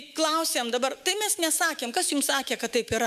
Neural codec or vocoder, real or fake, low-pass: autoencoder, 48 kHz, 128 numbers a frame, DAC-VAE, trained on Japanese speech; fake; 14.4 kHz